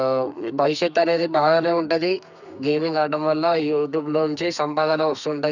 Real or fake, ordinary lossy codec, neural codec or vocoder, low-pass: fake; none; codec, 32 kHz, 1.9 kbps, SNAC; 7.2 kHz